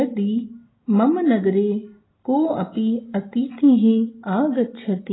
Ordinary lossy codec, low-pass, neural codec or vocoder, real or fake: AAC, 16 kbps; 7.2 kHz; none; real